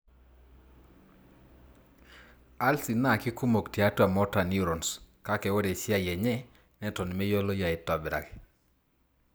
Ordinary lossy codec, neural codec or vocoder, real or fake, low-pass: none; none; real; none